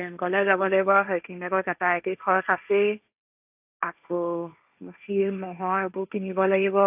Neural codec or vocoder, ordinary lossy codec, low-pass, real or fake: codec, 16 kHz, 1.1 kbps, Voila-Tokenizer; AAC, 32 kbps; 3.6 kHz; fake